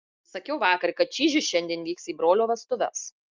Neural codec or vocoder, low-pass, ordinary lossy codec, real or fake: none; 7.2 kHz; Opus, 24 kbps; real